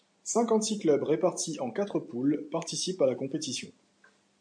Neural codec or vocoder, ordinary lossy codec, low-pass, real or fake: none; MP3, 64 kbps; 9.9 kHz; real